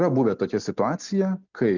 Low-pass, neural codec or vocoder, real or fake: 7.2 kHz; none; real